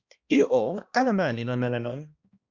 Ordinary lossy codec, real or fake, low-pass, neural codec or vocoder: Opus, 64 kbps; fake; 7.2 kHz; codec, 16 kHz, 1 kbps, X-Codec, HuBERT features, trained on balanced general audio